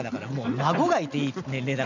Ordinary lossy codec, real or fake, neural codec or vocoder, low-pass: none; real; none; 7.2 kHz